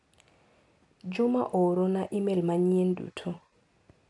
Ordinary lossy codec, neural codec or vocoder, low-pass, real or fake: none; none; 10.8 kHz; real